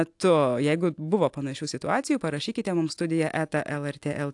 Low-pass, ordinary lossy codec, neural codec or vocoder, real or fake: 10.8 kHz; AAC, 64 kbps; none; real